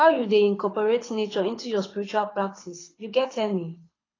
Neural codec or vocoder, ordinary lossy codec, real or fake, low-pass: codec, 24 kHz, 6 kbps, HILCodec; AAC, 32 kbps; fake; 7.2 kHz